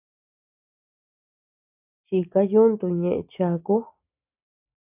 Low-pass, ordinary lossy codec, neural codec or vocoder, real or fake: 3.6 kHz; AAC, 32 kbps; none; real